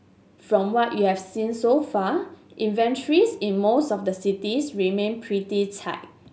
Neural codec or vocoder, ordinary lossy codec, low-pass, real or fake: none; none; none; real